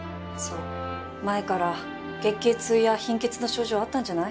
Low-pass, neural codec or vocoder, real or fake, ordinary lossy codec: none; none; real; none